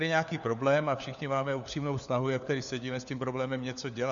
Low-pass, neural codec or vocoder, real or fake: 7.2 kHz; codec, 16 kHz, 4 kbps, FunCodec, trained on LibriTTS, 50 frames a second; fake